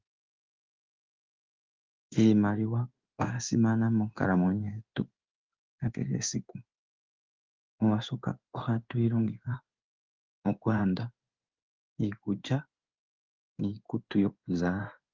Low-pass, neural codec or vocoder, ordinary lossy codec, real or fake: 7.2 kHz; codec, 16 kHz in and 24 kHz out, 1 kbps, XY-Tokenizer; Opus, 24 kbps; fake